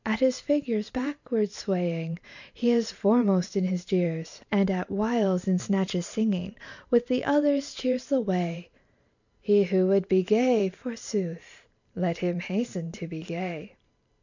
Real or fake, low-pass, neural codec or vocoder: fake; 7.2 kHz; vocoder, 44.1 kHz, 128 mel bands every 512 samples, BigVGAN v2